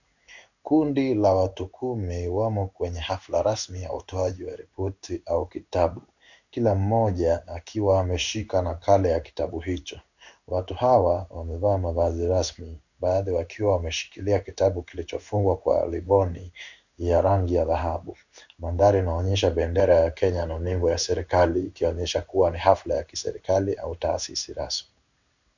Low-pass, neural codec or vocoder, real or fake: 7.2 kHz; codec, 16 kHz in and 24 kHz out, 1 kbps, XY-Tokenizer; fake